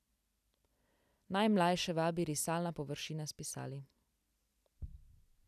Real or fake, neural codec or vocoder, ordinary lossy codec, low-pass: real; none; none; 14.4 kHz